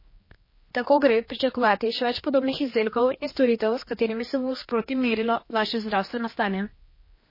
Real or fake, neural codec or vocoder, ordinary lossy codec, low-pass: fake; codec, 16 kHz, 2 kbps, X-Codec, HuBERT features, trained on general audio; MP3, 24 kbps; 5.4 kHz